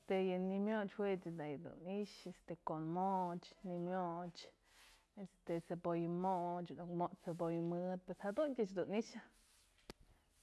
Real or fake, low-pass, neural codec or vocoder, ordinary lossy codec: real; none; none; none